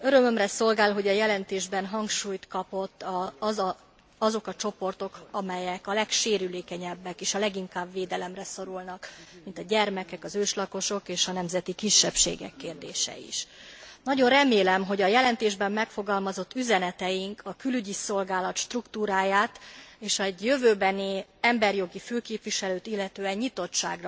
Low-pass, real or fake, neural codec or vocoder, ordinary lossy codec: none; real; none; none